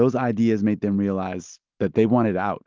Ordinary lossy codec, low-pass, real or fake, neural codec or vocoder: Opus, 24 kbps; 7.2 kHz; real; none